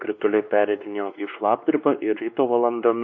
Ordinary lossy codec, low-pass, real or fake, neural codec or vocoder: MP3, 48 kbps; 7.2 kHz; fake; codec, 16 kHz, 2 kbps, X-Codec, WavLM features, trained on Multilingual LibriSpeech